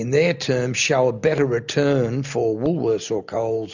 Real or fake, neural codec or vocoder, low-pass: real; none; 7.2 kHz